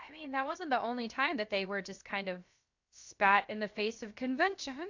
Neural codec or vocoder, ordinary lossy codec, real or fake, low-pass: codec, 16 kHz, about 1 kbps, DyCAST, with the encoder's durations; AAC, 48 kbps; fake; 7.2 kHz